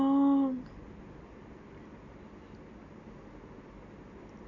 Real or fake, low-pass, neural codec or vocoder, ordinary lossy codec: real; 7.2 kHz; none; none